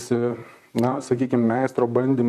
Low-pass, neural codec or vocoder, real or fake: 14.4 kHz; vocoder, 44.1 kHz, 128 mel bands, Pupu-Vocoder; fake